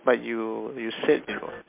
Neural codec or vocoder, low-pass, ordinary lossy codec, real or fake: none; 3.6 kHz; MP3, 32 kbps; real